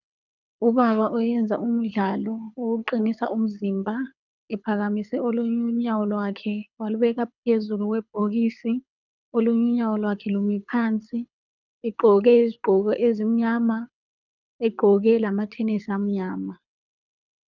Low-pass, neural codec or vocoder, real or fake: 7.2 kHz; codec, 24 kHz, 6 kbps, HILCodec; fake